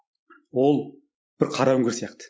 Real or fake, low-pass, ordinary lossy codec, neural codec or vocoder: real; none; none; none